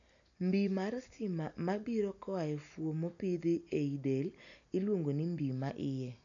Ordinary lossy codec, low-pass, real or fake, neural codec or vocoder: none; 7.2 kHz; real; none